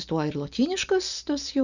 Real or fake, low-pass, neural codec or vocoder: real; 7.2 kHz; none